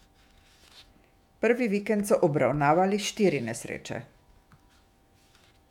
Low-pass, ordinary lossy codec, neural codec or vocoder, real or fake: 19.8 kHz; MP3, 96 kbps; autoencoder, 48 kHz, 128 numbers a frame, DAC-VAE, trained on Japanese speech; fake